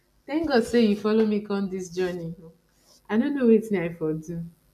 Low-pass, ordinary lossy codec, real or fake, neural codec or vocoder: 14.4 kHz; none; real; none